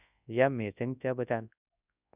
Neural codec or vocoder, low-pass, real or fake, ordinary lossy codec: codec, 24 kHz, 0.9 kbps, WavTokenizer, large speech release; 3.6 kHz; fake; none